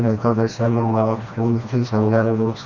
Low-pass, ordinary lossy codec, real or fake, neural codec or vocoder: 7.2 kHz; Opus, 64 kbps; fake; codec, 16 kHz, 1 kbps, FreqCodec, smaller model